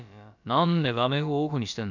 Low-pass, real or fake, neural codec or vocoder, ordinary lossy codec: 7.2 kHz; fake; codec, 16 kHz, about 1 kbps, DyCAST, with the encoder's durations; none